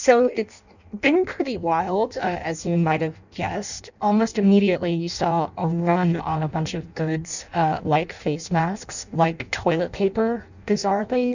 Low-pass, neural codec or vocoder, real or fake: 7.2 kHz; codec, 16 kHz in and 24 kHz out, 0.6 kbps, FireRedTTS-2 codec; fake